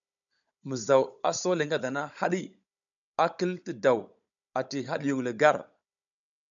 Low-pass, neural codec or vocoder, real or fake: 7.2 kHz; codec, 16 kHz, 16 kbps, FunCodec, trained on Chinese and English, 50 frames a second; fake